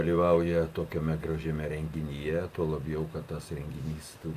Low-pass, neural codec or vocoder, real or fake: 14.4 kHz; none; real